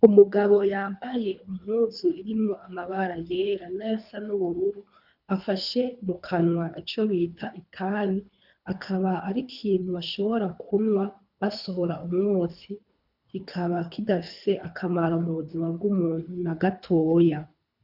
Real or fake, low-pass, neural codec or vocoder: fake; 5.4 kHz; codec, 24 kHz, 3 kbps, HILCodec